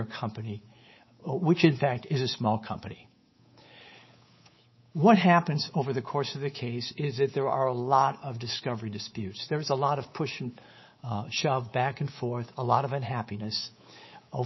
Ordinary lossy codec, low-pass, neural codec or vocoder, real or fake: MP3, 24 kbps; 7.2 kHz; codec, 24 kHz, 3.1 kbps, DualCodec; fake